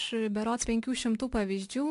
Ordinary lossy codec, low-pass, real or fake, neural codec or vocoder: AAC, 64 kbps; 10.8 kHz; real; none